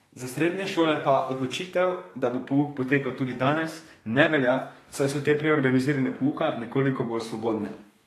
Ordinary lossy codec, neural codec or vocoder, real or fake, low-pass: AAC, 48 kbps; codec, 32 kHz, 1.9 kbps, SNAC; fake; 14.4 kHz